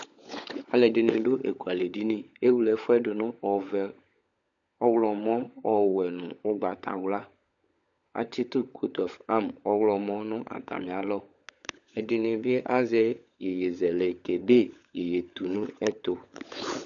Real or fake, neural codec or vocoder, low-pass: fake; codec, 16 kHz, 16 kbps, FunCodec, trained on LibriTTS, 50 frames a second; 7.2 kHz